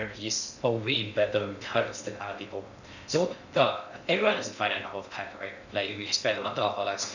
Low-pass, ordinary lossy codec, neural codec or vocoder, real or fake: 7.2 kHz; none; codec, 16 kHz in and 24 kHz out, 0.6 kbps, FocalCodec, streaming, 2048 codes; fake